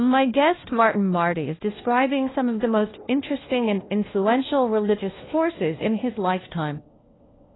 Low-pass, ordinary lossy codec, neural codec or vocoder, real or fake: 7.2 kHz; AAC, 16 kbps; codec, 16 kHz, 1 kbps, FunCodec, trained on LibriTTS, 50 frames a second; fake